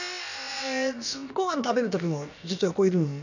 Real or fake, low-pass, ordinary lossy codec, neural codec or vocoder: fake; 7.2 kHz; none; codec, 16 kHz, about 1 kbps, DyCAST, with the encoder's durations